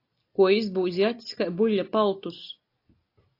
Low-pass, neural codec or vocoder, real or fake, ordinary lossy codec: 5.4 kHz; none; real; AAC, 32 kbps